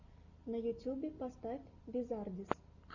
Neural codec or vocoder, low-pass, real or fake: none; 7.2 kHz; real